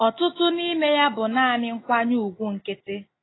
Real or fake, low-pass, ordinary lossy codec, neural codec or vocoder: real; 7.2 kHz; AAC, 16 kbps; none